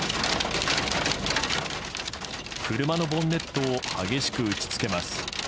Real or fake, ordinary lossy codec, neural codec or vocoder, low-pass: real; none; none; none